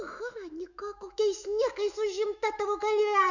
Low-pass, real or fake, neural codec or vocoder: 7.2 kHz; fake; autoencoder, 48 kHz, 128 numbers a frame, DAC-VAE, trained on Japanese speech